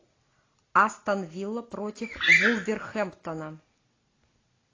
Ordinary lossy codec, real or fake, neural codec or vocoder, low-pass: AAC, 32 kbps; real; none; 7.2 kHz